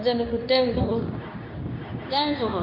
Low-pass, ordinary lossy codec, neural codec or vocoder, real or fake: 5.4 kHz; none; codec, 24 kHz, 0.9 kbps, WavTokenizer, medium speech release version 2; fake